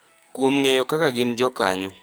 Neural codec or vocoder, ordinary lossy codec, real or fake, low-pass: codec, 44.1 kHz, 2.6 kbps, SNAC; none; fake; none